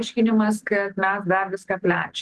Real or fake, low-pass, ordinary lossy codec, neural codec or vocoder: real; 10.8 kHz; Opus, 16 kbps; none